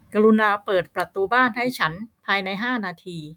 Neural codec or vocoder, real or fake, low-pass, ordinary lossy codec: autoencoder, 48 kHz, 128 numbers a frame, DAC-VAE, trained on Japanese speech; fake; 19.8 kHz; none